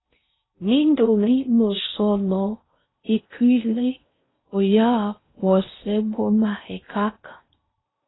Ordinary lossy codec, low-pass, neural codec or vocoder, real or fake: AAC, 16 kbps; 7.2 kHz; codec, 16 kHz in and 24 kHz out, 0.6 kbps, FocalCodec, streaming, 4096 codes; fake